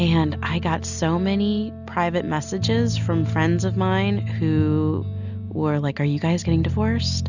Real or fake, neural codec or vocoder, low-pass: real; none; 7.2 kHz